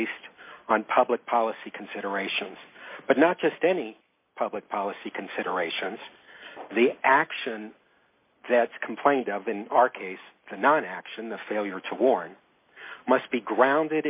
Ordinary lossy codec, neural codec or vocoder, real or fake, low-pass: MP3, 32 kbps; none; real; 3.6 kHz